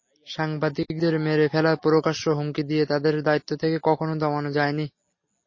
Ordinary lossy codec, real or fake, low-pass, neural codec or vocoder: MP3, 32 kbps; real; 7.2 kHz; none